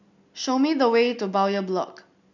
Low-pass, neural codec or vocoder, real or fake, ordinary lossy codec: 7.2 kHz; none; real; none